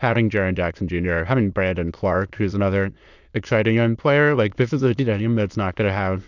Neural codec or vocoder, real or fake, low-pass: autoencoder, 22.05 kHz, a latent of 192 numbers a frame, VITS, trained on many speakers; fake; 7.2 kHz